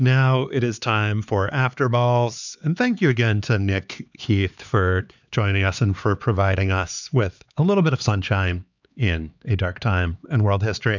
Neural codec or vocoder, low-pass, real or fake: codec, 16 kHz, 4 kbps, X-Codec, HuBERT features, trained on LibriSpeech; 7.2 kHz; fake